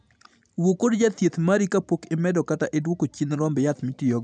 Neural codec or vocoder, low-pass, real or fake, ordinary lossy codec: none; 9.9 kHz; real; none